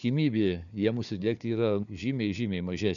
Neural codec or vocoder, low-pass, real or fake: codec, 16 kHz, 4 kbps, FunCodec, trained on Chinese and English, 50 frames a second; 7.2 kHz; fake